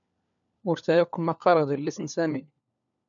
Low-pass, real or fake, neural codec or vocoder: 7.2 kHz; fake; codec, 16 kHz, 4 kbps, FunCodec, trained on LibriTTS, 50 frames a second